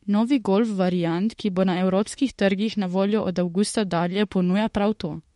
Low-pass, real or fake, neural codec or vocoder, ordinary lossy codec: 19.8 kHz; fake; autoencoder, 48 kHz, 32 numbers a frame, DAC-VAE, trained on Japanese speech; MP3, 48 kbps